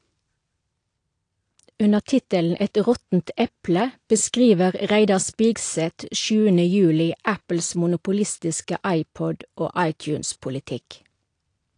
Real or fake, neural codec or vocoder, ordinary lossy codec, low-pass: real; none; AAC, 48 kbps; 9.9 kHz